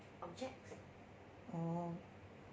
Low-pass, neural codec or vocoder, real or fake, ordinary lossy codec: none; none; real; none